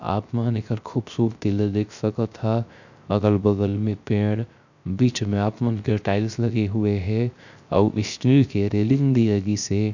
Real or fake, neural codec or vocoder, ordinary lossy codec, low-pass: fake; codec, 16 kHz, 0.3 kbps, FocalCodec; none; 7.2 kHz